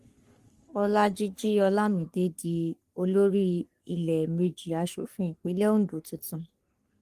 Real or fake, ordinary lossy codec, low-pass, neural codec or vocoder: fake; Opus, 24 kbps; 14.4 kHz; codec, 44.1 kHz, 3.4 kbps, Pupu-Codec